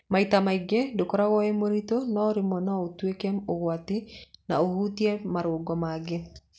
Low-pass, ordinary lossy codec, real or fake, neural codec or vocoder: none; none; real; none